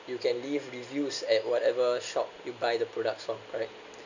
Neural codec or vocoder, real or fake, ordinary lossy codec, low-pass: none; real; none; 7.2 kHz